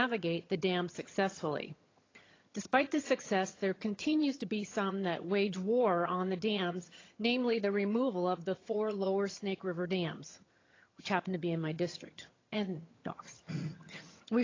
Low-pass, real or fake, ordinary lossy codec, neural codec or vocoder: 7.2 kHz; fake; AAC, 32 kbps; vocoder, 22.05 kHz, 80 mel bands, HiFi-GAN